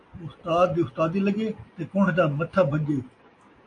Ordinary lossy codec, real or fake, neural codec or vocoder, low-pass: AAC, 48 kbps; real; none; 9.9 kHz